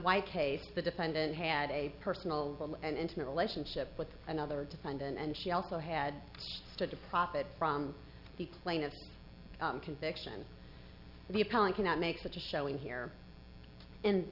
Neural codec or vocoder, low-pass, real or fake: none; 5.4 kHz; real